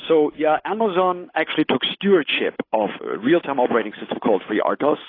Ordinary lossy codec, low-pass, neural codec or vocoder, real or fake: AAC, 24 kbps; 5.4 kHz; codec, 16 kHz, 8 kbps, FunCodec, trained on Chinese and English, 25 frames a second; fake